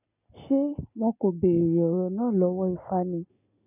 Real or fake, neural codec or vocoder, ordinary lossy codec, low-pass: real; none; none; 3.6 kHz